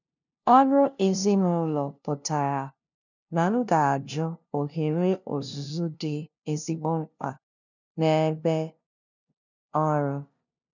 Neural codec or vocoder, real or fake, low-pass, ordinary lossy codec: codec, 16 kHz, 0.5 kbps, FunCodec, trained on LibriTTS, 25 frames a second; fake; 7.2 kHz; none